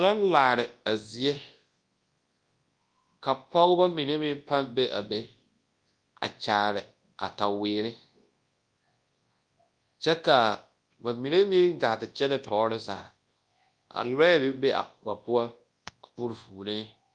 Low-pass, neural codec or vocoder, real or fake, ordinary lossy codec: 9.9 kHz; codec, 24 kHz, 0.9 kbps, WavTokenizer, large speech release; fake; Opus, 32 kbps